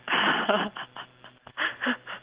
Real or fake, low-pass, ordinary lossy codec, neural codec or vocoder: real; 3.6 kHz; Opus, 16 kbps; none